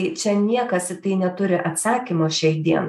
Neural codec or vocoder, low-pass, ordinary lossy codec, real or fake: none; 14.4 kHz; MP3, 96 kbps; real